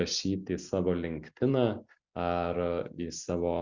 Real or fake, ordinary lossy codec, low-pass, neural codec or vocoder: real; Opus, 64 kbps; 7.2 kHz; none